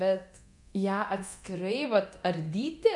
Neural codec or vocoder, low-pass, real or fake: codec, 24 kHz, 0.9 kbps, DualCodec; 10.8 kHz; fake